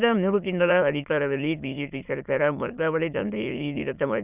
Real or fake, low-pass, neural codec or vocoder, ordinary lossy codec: fake; 3.6 kHz; autoencoder, 22.05 kHz, a latent of 192 numbers a frame, VITS, trained on many speakers; none